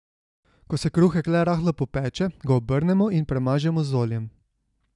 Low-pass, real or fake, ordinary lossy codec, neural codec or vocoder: 10.8 kHz; real; none; none